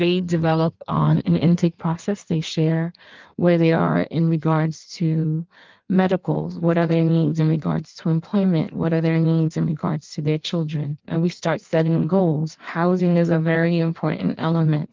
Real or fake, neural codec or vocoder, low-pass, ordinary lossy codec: fake; codec, 16 kHz in and 24 kHz out, 1.1 kbps, FireRedTTS-2 codec; 7.2 kHz; Opus, 24 kbps